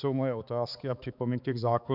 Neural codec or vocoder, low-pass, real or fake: codec, 16 kHz, 4 kbps, X-Codec, HuBERT features, trained on balanced general audio; 5.4 kHz; fake